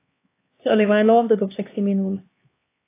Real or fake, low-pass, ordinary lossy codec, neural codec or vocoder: fake; 3.6 kHz; AAC, 24 kbps; codec, 16 kHz, 2 kbps, X-Codec, HuBERT features, trained on LibriSpeech